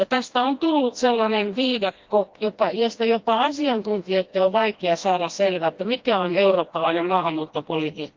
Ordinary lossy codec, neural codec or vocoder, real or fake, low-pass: Opus, 32 kbps; codec, 16 kHz, 1 kbps, FreqCodec, smaller model; fake; 7.2 kHz